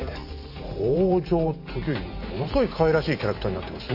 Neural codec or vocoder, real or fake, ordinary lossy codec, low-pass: vocoder, 44.1 kHz, 128 mel bands every 512 samples, BigVGAN v2; fake; none; 5.4 kHz